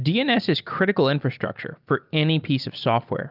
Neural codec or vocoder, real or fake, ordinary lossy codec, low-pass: none; real; Opus, 32 kbps; 5.4 kHz